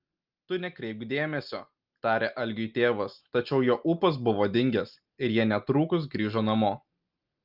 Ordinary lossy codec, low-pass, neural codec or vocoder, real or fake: Opus, 24 kbps; 5.4 kHz; none; real